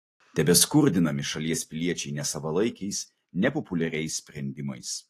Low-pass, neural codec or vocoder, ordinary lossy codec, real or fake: 14.4 kHz; none; AAC, 48 kbps; real